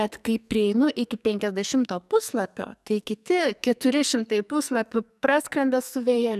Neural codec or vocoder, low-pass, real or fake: codec, 44.1 kHz, 2.6 kbps, SNAC; 14.4 kHz; fake